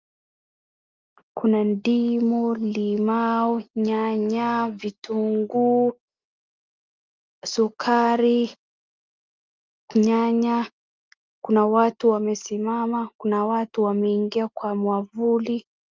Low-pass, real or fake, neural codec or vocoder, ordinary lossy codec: 7.2 kHz; real; none; Opus, 24 kbps